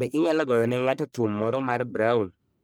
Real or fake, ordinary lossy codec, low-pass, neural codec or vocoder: fake; none; none; codec, 44.1 kHz, 1.7 kbps, Pupu-Codec